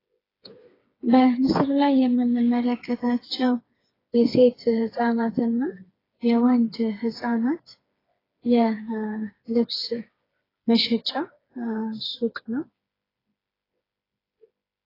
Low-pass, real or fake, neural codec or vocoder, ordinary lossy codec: 5.4 kHz; fake; codec, 16 kHz, 4 kbps, FreqCodec, smaller model; AAC, 24 kbps